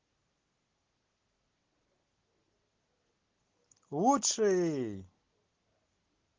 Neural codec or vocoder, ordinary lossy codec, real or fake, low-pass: none; Opus, 16 kbps; real; 7.2 kHz